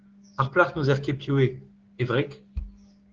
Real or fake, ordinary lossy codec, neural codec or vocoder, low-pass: fake; Opus, 16 kbps; codec, 16 kHz, 6 kbps, DAC; 7.2 kHz